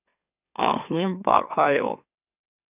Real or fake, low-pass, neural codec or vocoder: fake; 3.6 kHz; autoencoder, 44.1 kHz, a latent of 192 numbers a frame, MeloTTS